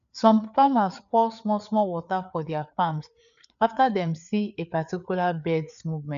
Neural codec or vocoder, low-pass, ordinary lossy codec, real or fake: codec, 16 kHz, 4 kbps, FreqCodec, larger model; 7.2 kHz; none; fake